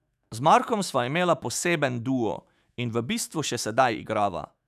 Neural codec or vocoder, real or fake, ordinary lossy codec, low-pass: autoencoder, 48 kHz, 128 numbers a frame, DAC-VAE, trained on Japanese speech; fake; none; 14.4 kHz